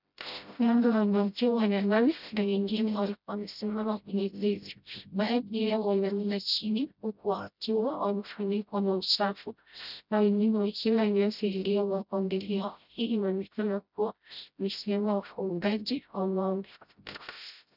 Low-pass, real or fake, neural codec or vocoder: 5.4 kHz; fake; codec, 16 kHz, 0.5 kbps, FreqCodec, smaller model